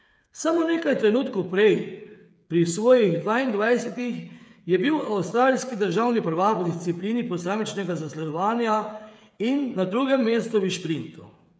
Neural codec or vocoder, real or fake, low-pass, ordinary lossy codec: codec, 16 kHz, 8 kbps, FreqCodec, smaller model; fake; none; none